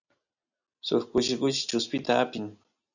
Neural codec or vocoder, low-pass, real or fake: none; 7.2 kHz; real